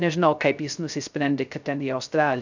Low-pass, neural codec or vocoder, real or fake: 7.2 kHz; codec, 16 kHz, 0.3 kbps, FocalCodec; fake